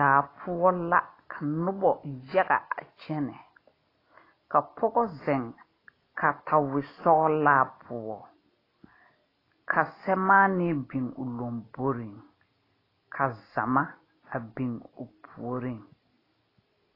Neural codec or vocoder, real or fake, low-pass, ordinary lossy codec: none; real; 5.4 kHz; AAC, 24 kbps